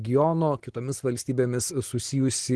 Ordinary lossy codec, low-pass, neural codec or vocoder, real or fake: Opus, 16 kbps; 10.8 kHz; none; real